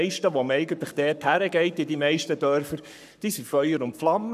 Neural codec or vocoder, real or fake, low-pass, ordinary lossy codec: codec, 44.1 kHz, 7.8 kbps, Pupu-Codec; fake; 14.4 kHz; none